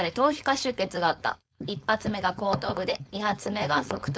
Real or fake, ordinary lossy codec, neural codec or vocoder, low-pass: fake; none; codec, 16 kHz, 4.8 kbps, FACodec; none